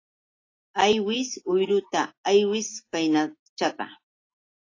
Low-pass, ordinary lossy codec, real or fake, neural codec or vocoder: 7.2 kHz; AAC, 32 kbps; real; none